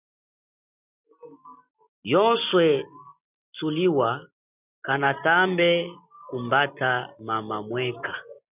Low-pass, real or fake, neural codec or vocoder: 3.6 kHz; real; none